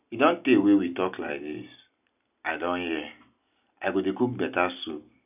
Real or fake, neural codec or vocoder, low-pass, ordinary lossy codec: real; none; 3.6 kHz; none